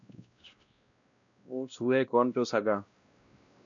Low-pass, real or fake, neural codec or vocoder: 7.2 kHz; fake; codec, 16 kHz, 1 kbps, X-Codec, WavLM features, trained on Multilingual LibriSpeech